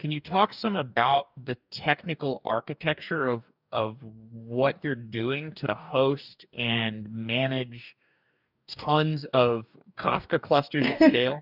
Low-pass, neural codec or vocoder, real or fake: 5.4 kHz; codec, 44.1 kHz, 2.6 kbps, DAC; fake